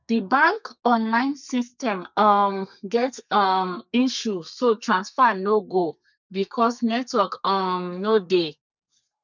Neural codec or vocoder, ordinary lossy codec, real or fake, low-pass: codec, 32 kHz, 1.9 kbps, SNAC; none; fake; 7.2 kHz